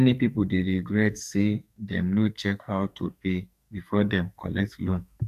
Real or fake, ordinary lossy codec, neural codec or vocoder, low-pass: fake; none; codec, 44.1 kHz, 2.6 kbps, SNAC; 14.4 kHz